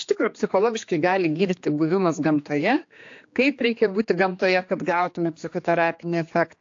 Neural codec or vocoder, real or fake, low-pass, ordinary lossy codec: codec, 16 kHz, 2 kbps, X-Codec, HuBERT features, trained on general audio; fake; 7.2 kHz; AAC, 48 kbps